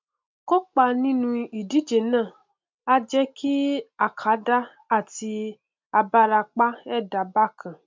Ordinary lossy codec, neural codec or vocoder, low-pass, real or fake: MP3, 64 kbps; none; 7.2 kHz; real